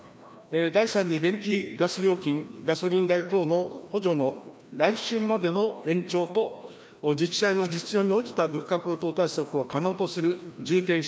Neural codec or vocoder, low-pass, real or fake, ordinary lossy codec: codec, 16 kHz, 1 kbps, FreqCodec, larger model; none; fake; none